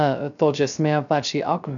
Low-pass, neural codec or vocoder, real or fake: 7.2 kHz; codec, 16 kHz, 0.3 kbps, FocalCodec; fake